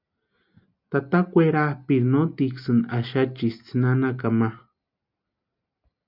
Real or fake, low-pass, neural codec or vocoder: real; 5.4 kHz; none